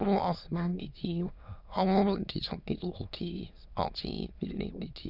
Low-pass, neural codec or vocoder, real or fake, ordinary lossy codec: 5.4 kHz; autoencoder, 22.05 kHz, a latent of 192 numbers a frame, VITS, trained on many speakers; fake; none